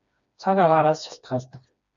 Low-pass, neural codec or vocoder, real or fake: 7.2 kHz; codec, 16 kHz, 2 kbps, FreqCodec, smaller model; fake